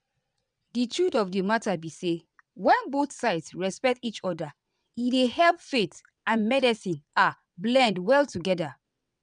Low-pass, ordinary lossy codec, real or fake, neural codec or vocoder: 9.9 kHz; none; fake; vocoder, 22.05 kHz, 80 mel bands, WaveNeXt